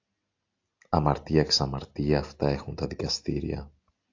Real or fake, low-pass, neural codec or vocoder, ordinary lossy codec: real; 7.2 kHz; none; AAC, 48 kbps